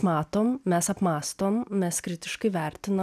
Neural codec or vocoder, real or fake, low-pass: none; real; 14.4 kHz